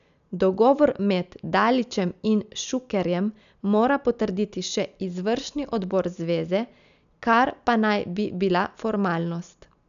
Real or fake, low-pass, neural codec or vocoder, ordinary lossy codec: real; 7.2 kHz; none; none